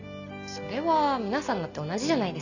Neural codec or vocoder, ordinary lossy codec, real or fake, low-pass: none; none; real; 7.2 kHz